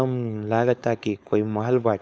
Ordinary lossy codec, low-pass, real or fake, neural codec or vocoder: none; none; fake; codec, 16 kHz, 4.8 kbps, FACodec